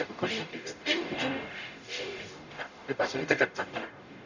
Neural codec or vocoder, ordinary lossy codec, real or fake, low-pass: codec, 44.1 kHz, 0.9 kbps, DAC; none; fake; 7.2 kHz